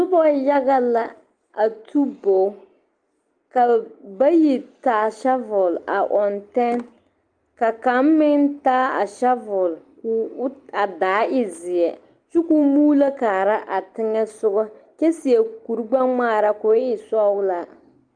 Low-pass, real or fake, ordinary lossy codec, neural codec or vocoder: 9.9 kHz; real; Opus, 32 kbps; none